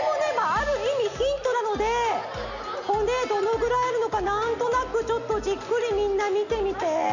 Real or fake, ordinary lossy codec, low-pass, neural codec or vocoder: real; none; 7.2 kHz; none